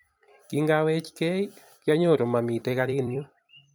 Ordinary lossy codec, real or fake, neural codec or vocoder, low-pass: none; fake; vocoder, 44.1 kHz, 128 mel bands every 256 samples, BigVGAN v2; none